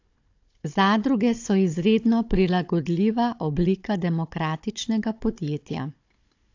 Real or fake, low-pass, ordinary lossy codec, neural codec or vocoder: fake; 7.2 kHz; AAC, 48 kbps; codec, 16 kHz, 16 kbps, FunCodec, trained on Chinese and English, 50 frames a second